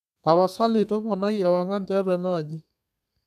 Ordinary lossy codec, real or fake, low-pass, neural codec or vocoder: none; fake; 14.4 kHz; codec, 32 kHz, 1.9 kbps, SNAC